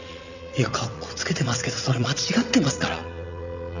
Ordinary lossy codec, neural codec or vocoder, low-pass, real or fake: none; vocoder, 22.05 kHz, 80 mel bands, WaveNeXt; 7.2 kHz; fake